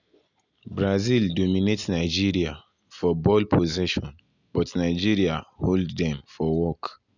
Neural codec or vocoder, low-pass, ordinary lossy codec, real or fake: none; 7.2 kHz; none; real